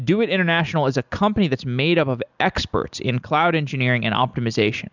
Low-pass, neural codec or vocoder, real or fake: 7.2 kHz; none; real